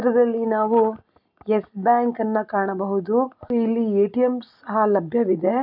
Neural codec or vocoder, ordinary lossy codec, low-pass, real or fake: none; none; 5.4 kHz; real